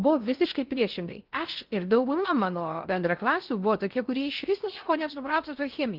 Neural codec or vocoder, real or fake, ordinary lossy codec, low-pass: codec, 16 kHz in and 24 kHz out, 0.6 kbps, FocalCodec, streaming, 2048 codes; fake; Opus, 32 kbps; 5.4 kHz